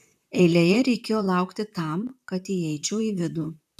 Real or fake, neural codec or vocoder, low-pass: fake; vocoder, 44.1 kHz, 128 mel bands, Pupu-Vocoder; 14.4 kHz